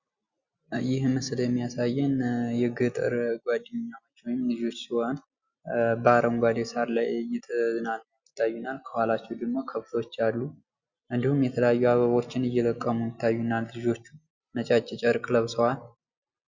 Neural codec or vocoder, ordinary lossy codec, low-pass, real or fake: none; Opus, 64 kbps; 7.2 kHz; real